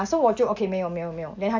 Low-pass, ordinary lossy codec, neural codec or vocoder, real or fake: 7.2 kHz; AAC, 48 kbps; none; real